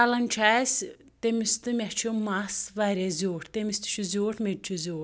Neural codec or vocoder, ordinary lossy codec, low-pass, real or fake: none; none; none; real